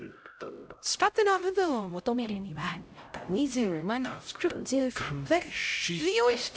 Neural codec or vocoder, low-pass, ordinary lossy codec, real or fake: codec, 16 kHz, 0.5 kbps, X-Codec, HuBERT features, trained on LibriSpeech; none; none; fake